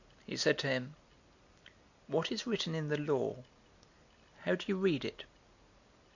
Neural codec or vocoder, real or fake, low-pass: none; real; 7.2 kHz